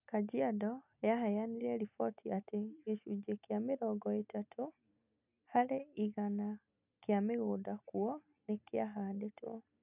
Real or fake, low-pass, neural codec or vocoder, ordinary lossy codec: real; 3.6 kHz; none; none